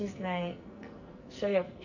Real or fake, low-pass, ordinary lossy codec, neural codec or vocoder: fake; 7.2 kHz; none; codec, 44.1 kHz, 2.6 kbps, SNAC